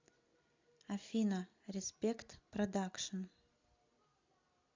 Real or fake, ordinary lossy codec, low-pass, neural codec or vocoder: real; MP3, 64 kbps; 7.2 kHz; none